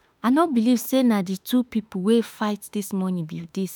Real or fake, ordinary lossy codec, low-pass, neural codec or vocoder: fake; none; none; autoencoder, 48 kHz, 32 numbers a frame, DAC-VAE, trained on Japanese speech